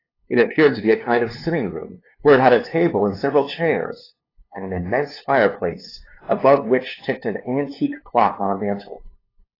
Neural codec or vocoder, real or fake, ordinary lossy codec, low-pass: codec, 16 kHz, 2 kbps, FunCodec, trained on LibriTTS, 25 frames a second; fake; AAC, 24 kbps; 5.4 kHz